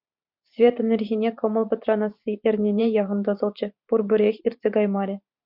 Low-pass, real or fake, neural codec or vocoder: 5.4 kHz; fake; vocoder, 44.1 kHz, 128 mel bands every 512 samples, BigVGAN v2